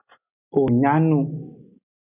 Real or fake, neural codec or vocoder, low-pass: real; none; 3.6 kHz